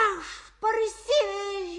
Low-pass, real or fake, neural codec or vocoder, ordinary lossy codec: 10.8 kHz; real; none; AAC, 32 kbps